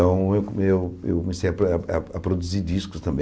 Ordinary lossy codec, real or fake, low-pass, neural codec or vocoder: none; real; none; none